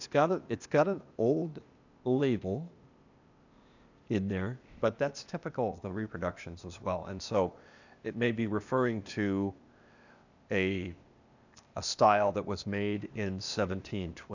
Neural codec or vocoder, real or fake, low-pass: codec, 16 kHz, 0.8 kbps, ZipCodec; fake; 7.2 kHz